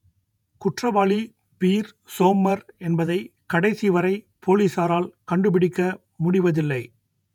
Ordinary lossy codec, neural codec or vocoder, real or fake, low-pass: none; vocoder, 48 kHz, 128 mel bands, Vocos; fake; 19.8 kHz